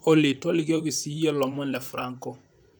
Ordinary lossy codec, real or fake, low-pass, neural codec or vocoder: none; fake; none; vocoder, 44.1 kHz, 128 mel bands, Pupu-Vocoder